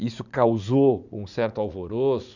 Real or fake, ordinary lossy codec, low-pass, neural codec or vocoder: fake; none; 7.2 kHz; vocoder, 44.1 kHz, 80 mel bands, Vocos